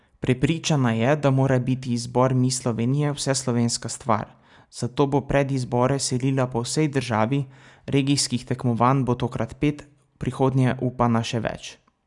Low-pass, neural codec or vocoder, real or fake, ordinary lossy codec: 10.8 kHz; none; real; none